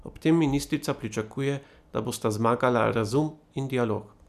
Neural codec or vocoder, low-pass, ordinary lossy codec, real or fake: none; 14.4 kHz; none; real